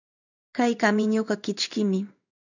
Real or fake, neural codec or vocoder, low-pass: fake; codec, 16 kHz in and 24 kHz out, 1 kbps, XY-Tokenizer; 7.2 kHz